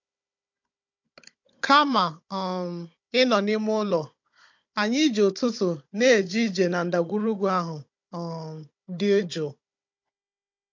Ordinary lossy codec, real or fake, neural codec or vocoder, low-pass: MP3, 48 kbps; fake; codec, 16 kHz, 16 kbps, FunCodec, trained on Chinese and English, 50 frames a second; 7.2 kHz